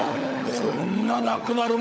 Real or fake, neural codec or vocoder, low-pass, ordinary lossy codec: fake; codec, 16 kHz, 16 kbps, FunCodec, trained on LibriTTS, 50 frames a second; none; none